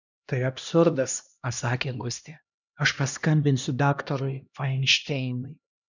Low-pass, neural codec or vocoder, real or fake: 7.2 kHz; codec, 16 kHz, 1 kbps, X-Codec, HuBERT features, trained on LibriSpeech; fake